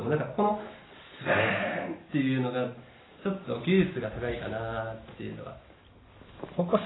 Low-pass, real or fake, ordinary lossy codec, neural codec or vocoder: 7.2 kHz; real; AAC, 16 kbps; none